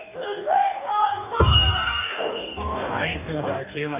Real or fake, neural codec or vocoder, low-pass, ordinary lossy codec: fake; codec, 44.1 kHz, 2.6 kbps, DAC; 3.6 kHz; none